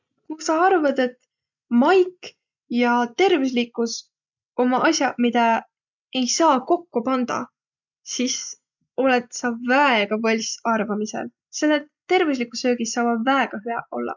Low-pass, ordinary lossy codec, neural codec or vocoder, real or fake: 7.2 kHz; none; none; real